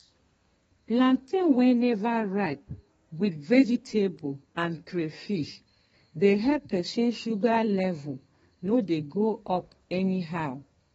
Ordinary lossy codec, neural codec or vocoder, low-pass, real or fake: AAC, 24 kbps; codec, 32 kHz, 1.9 kbps, SNAC; 14.4 kHz; fake